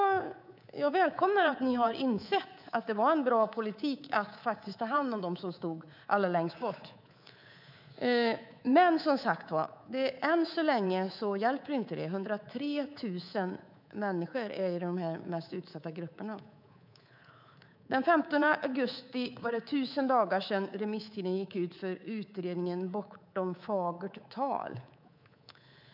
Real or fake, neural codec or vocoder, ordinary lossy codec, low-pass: fake; codec, 24 kHz, 3.1 kbps, DualCodec; none; 5.4 kHz